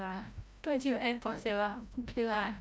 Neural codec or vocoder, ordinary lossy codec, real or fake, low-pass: codec, 16 kHz, 0.5 kbps, FreqCodec, larger model; none; fake; none